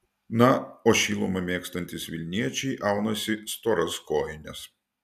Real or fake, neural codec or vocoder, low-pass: real; none; 14.4 kHz